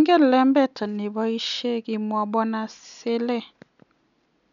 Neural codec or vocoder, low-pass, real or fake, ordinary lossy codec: none; 7.2 kHz; real; none